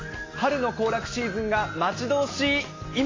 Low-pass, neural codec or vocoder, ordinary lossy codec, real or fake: 7.2 kHz; none; AAC, 32 kbps; real